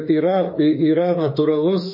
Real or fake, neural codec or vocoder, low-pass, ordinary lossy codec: fake; autoencoder, 48 kHz, 32 numbers a frame, DAC-VAE, trained on Japanese speech; 5.4 kHz; MP3, 24 kbps